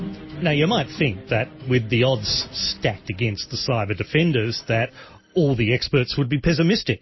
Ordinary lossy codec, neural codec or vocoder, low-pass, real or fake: MP3, 24 kbps; none; 7.2 kHz; real